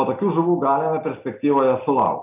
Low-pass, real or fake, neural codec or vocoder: 3.6 kHz; real; none